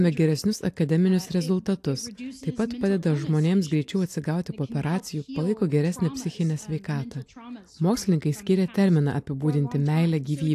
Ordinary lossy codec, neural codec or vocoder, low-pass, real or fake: AAC, 64 kbps; none; 14.4 kHz; real